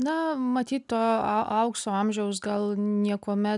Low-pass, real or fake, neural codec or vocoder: 10.8 kHz; real; none